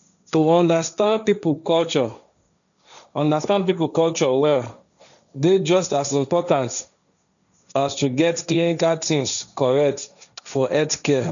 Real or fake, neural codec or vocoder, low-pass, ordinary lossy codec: fake; codec, 16 kHz, 1.1 kbps, Voila-Tokenizer; 7.2 kHz; none